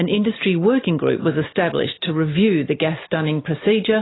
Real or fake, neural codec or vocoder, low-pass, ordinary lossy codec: real; none; 7.2 kHz; AAC, 16 kbps